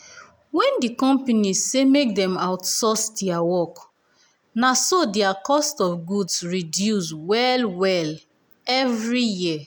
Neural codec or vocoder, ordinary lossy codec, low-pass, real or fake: none; none; none; real